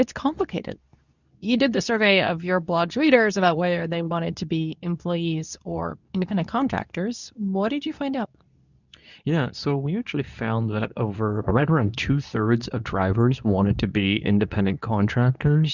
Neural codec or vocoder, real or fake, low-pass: codec, 24 kHz, 0.9 kbps, WavTokenizer, medium speech release version 2; fake; 7.2 kHz